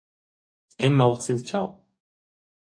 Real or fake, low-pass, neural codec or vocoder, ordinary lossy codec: fake; 9.9 kHz; codec, 44.1 kHz, 2.6 kbps, DAC; AAC, 64 kbps